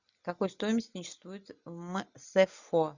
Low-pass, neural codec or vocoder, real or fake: 7.2 kHz; none; real